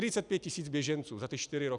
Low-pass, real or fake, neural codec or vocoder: 10.8 kHz; real; none